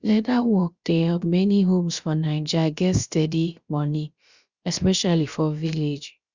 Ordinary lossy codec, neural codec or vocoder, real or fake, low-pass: Opus, 64 kbps; codec, 16 kHz, about 1 kbps, DyCAST, with the encoder's durations; fake; 7.2 kHz